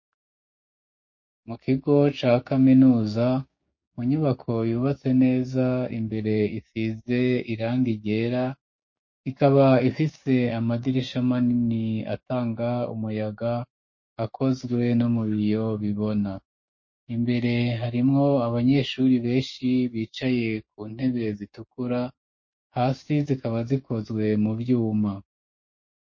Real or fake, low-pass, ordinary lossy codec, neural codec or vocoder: fake; 7.2 kHz; MP3, 32 kbps; codec, 16 kHz, 6 kbps, DAC